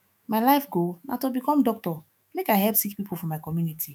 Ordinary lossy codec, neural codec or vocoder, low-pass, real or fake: none; autoencoder, 48 kHz, 128 numbers a frame, DAC-VAE, trained on Japanese speech; none; fake